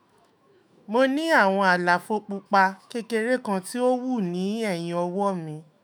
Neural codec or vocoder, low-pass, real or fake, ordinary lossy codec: autoencoder, 48 kHz, 128 numbers a frame, DAC-VAE, trained on Japanese speech; none; fake; none